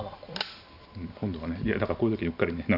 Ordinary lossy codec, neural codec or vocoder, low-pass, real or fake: none; none; 5.4 kHz; real